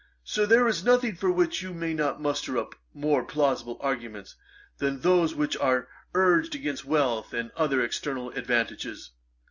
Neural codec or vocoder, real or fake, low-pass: none; real; 7.2 kHz